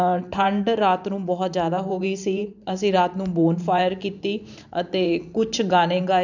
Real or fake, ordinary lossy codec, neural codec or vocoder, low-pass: fake; none; vocoder, 44.1 kHz, 128 mel bands every 512 samples, BigVGAN v2; 7.2 kHz